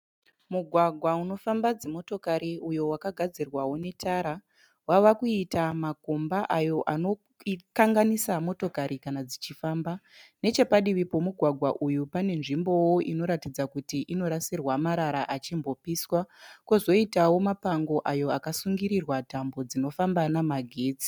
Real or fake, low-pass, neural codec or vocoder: real; 19.8 kHz; none